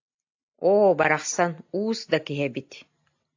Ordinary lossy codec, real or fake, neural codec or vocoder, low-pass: AAC, 48 kbps; real; none; 7.2 kHz